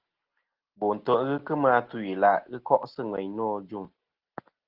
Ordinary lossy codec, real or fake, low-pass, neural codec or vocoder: Opus, 16 kbps; real; 5.4 kHz; none